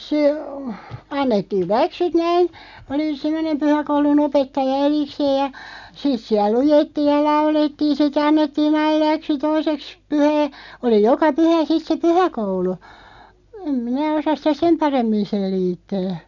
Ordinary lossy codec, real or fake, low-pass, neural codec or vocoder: none; real; 7.2 kHz; none